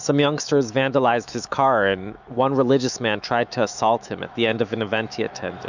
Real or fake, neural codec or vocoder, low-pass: fake; autoencoder, 48 kHz, 128 numbers a frame, DAC-VAE, trained on Japanese speech; 7.2 kHz